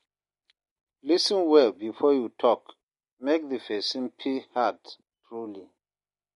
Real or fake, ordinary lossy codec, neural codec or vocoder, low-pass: real; MP3, 48 kbps; none; 14.4 kHz